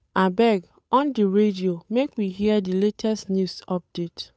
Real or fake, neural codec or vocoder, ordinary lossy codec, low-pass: fake; codec, 16 kHz, 8 kbps, FunCodec, trained on Chinese and English, 25 frames a second; none; none